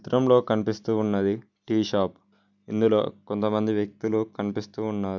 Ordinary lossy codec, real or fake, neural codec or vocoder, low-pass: none; real; none; 7.2 kHz